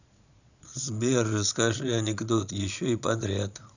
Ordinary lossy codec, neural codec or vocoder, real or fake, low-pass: none; vocoder, 22.05 kHz, 80 mel bands, Vocos; fake; 7.2 kHz